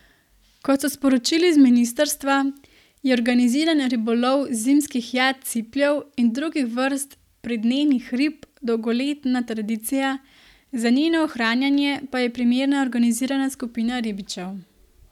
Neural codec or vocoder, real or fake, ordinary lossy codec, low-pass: none; real; none; 19.8 kHz